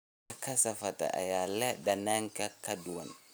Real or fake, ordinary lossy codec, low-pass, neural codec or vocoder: real; none; none; none